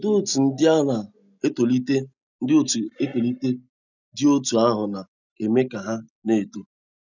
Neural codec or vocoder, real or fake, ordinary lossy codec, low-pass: none; real; none; 7.2 kHz